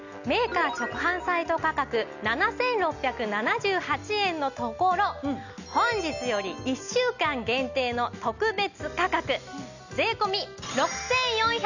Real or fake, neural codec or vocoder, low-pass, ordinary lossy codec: real; none; 7.2 kHz; none